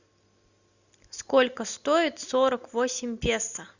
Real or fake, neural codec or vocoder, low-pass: real; none; 7.2 kHz